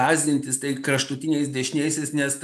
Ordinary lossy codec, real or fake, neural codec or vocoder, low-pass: MP3, 96 kbps; real; none; 14.4 kHz